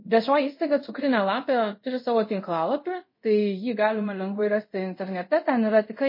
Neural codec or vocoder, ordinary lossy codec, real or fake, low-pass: codec, 24 kHz, 0.5 kbps, DualCodec; MP3, 24 kbps; fake; 5.4 kHz